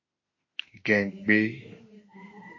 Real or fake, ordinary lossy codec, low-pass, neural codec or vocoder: fake; MP3, 32 kbps; 7.2 kHz; autoencoder, 48 kHz, 32 numbers a frame, DAC-VAE, trained on Japanese speech